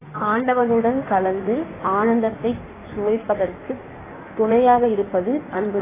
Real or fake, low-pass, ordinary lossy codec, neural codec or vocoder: fake; 3.6 kHz; AAC, 16 kbps; codec, 16 kHz in and 24 kHz out, 1.1 kbps, FireRedTTS-2 codec